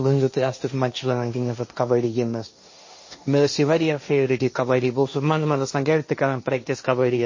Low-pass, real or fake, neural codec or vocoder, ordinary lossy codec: 7.2 kHz; fake; codec, 16 kHz, 1.1 kbps, Voila-Tokenizer; MP3, 32 kbps